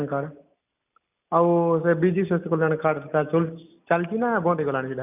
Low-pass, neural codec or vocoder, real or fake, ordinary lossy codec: 3.6 kHz; none; real; none